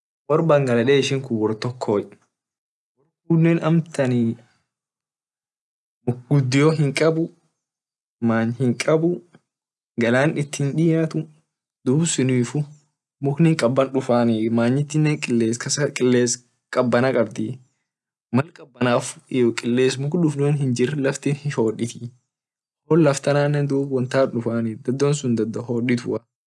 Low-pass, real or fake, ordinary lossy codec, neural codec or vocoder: none; real; none; none